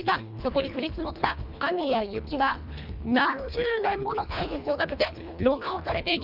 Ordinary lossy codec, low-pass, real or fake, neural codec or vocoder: none; 5.4 kHz; fake; codec, 24 kHz, 1.5 kbps, HILCodec